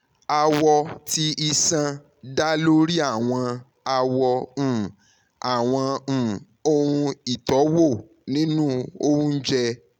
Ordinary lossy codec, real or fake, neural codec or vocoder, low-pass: none; real; none; 19.8 kHz